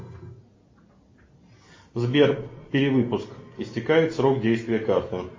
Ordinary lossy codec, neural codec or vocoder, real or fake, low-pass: MP3, 32 kbps; none; real; 7.2 kHz